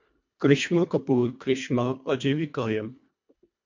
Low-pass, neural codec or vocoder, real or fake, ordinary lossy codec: 7.2 kHz; codec, 24 kHz, 1.5 kbps, HILCodec; fake; MP3, 48 kbps